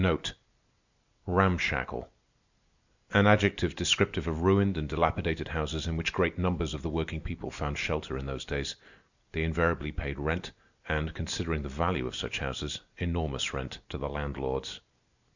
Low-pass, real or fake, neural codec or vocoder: 7.2 kHz; real; none